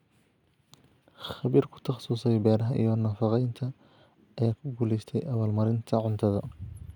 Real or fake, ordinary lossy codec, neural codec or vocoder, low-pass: real; none; none; 19.8 kHz